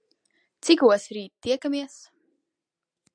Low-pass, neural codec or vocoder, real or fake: 9.9 kHz; none; real